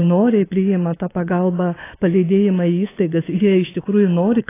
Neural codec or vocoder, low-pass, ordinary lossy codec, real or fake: codec, 24 kHz, 3.1 kbps, DualCodec; 3.6 kHz; AAC, 16 kbps; fake